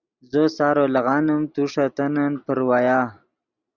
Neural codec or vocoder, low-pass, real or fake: none; 7.2 kHz; real